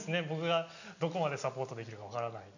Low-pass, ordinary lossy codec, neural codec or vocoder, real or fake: 7.2 kHz; none; none; real